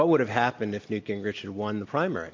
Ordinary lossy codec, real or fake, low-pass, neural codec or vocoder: AAC, 48 kbps; real; 7.2 kHz; none